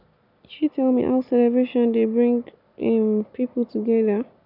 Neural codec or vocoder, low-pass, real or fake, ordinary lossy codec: none; 5.4 kHz; real; none